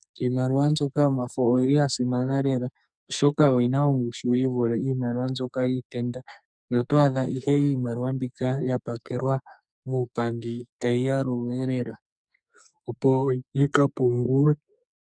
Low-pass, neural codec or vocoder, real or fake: 9.9 kHz; codec, 44.1 kHz, 2.6 kbps, SNAC; fake